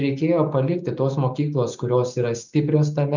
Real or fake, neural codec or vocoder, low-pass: real; none; 7.2 kHz